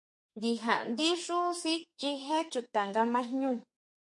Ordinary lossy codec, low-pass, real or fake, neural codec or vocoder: MP3, 48 kbps; 10.8 kHz; fake; codec, 32 kHz, 1.9 kbps, SNAC